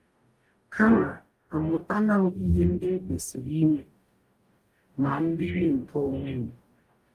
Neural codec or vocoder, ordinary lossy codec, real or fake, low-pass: codec, 44.1 kHz, 0.9 kbps, DAC; Opus, 32 kbps; fake; 14.4 kHz